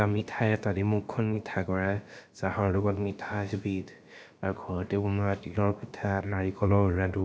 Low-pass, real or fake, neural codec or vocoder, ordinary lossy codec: none; fake; codec, 16 kHz, about 1 kbps, DyCAST, with the encoder's durations; none